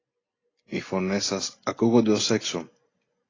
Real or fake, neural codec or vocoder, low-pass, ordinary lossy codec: real; none; 7.2 kHz; AAC, 32 kbps